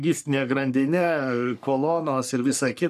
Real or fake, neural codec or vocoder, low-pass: fake; codec, 44.1 kHz, 3.4 kbps, Pupu-Codec; 14.4 kHz